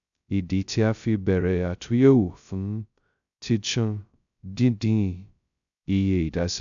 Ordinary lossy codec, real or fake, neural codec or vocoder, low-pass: none; fake; codec, 16 kHz, 0.2 kbps, FocalCodec; 7.2 kHz